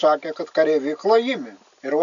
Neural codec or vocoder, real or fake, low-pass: none; real; 7.2 kHz